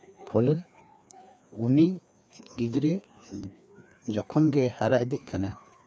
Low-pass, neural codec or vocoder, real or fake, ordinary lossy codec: none; codec, 16 kHz, 2 kbps, FreqCodec, larger model; fake; none